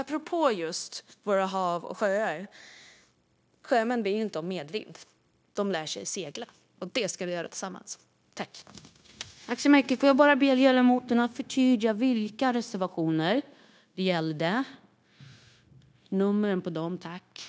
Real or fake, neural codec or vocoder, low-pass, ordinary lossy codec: fake; codec, 16 kHz, 0.9 kbps, LongCat-Audio-Codec; none; none